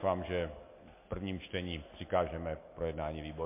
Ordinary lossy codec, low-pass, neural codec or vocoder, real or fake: MP3, 24 kbps; 3.6 kHz; none; real